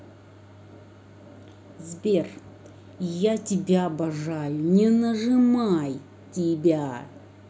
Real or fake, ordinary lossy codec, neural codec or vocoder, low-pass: real; none; none; none